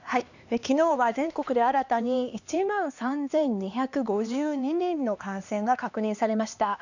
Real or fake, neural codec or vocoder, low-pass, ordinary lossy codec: fake; codec, 16 kHz, 2 kbps, X-Codec, HuBERT features, trained on LibriSpeech; 7.2 kHz; none